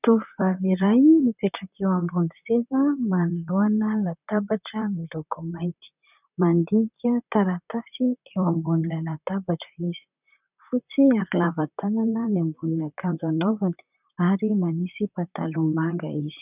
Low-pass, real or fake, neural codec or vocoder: 3.6 kHz; fake; vocoder, 44.1 kHz, 128 mel bands, Pupu-Vocoder